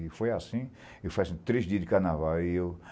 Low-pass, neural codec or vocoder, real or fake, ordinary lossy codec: none; none; real; none